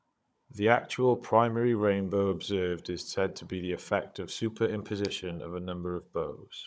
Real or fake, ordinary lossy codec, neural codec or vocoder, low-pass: fake; none; codec, 16 kHz, 16 kbps, FunCodec, trained on Chinese and English, 50 frames a second; none